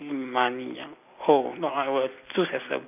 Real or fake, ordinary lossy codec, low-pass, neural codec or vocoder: real; none; 3.6 kHz; none